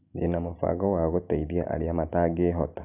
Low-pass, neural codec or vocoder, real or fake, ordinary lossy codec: 3.6 kHz; none; real; none